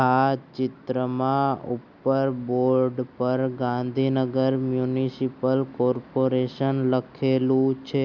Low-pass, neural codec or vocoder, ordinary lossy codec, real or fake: 7.2 kHz; none; none; real